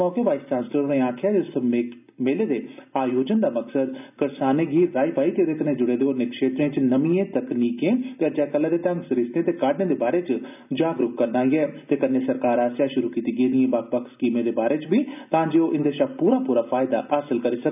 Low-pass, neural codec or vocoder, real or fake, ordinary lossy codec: 3.6 kHz; none; real; none